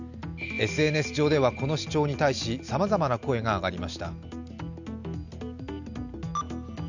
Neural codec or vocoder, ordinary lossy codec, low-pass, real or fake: none; none; 7.2 kHz; real